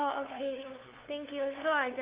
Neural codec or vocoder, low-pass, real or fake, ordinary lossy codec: codec, 16 kHz, 2 kbps, FunCodec, trained on LibriTTS, 25 frames a second; 3.6 kHz; fake; Opus, 32 kbps